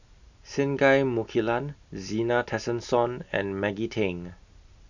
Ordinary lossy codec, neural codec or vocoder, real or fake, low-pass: none; none; real; 7.2 kHz